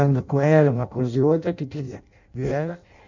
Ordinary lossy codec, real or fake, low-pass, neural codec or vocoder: none; fake; 7.2 kHz; codec, 16 kHz in and 24 kHz out, 0.6 kbps, FireRedTTS-2 codec